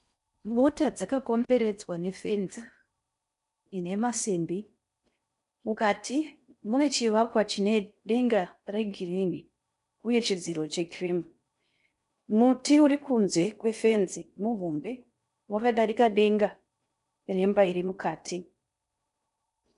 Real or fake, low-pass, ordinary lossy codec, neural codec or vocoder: fake; 10.8 kHz; MP3, 96 kbps; codec, 16 kHz in and 24 kHz out, 0.6 kbps, FocalCodec, streaming, 4096 codes